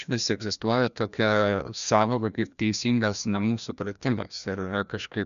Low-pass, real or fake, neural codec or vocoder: 7.2 kHz; fake; codec, 16 kHz, 1 kbps, FreqCodec, larger model